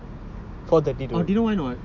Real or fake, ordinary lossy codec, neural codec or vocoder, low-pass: real; none; none; 7.2 kHz